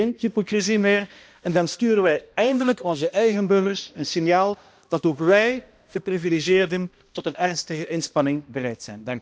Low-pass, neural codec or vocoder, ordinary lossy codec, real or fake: none; codec, 16 kHz, 1 kbps, X-Codec, HuBERT features, trained on balanced general audio; none; fake